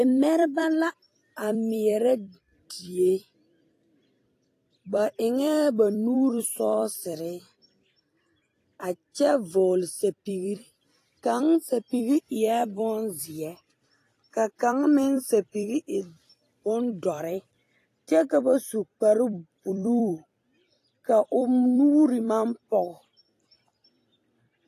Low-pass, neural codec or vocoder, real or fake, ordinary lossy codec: 14.4 kHz; vocoder, 44.1 kHz, 128 mel bands every 256 samples, BigVGAN v2; fake; AAC, 48 kbps